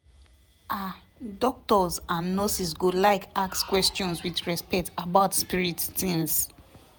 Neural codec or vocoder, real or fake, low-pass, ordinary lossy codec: vocoder, 48 kHz, 128 mel bands, Vocos; fake; none; none